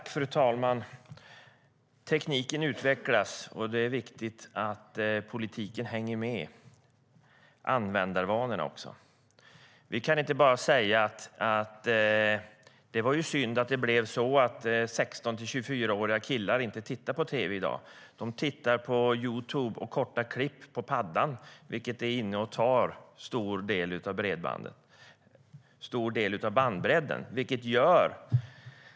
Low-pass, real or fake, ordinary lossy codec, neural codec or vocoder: none; real; none; none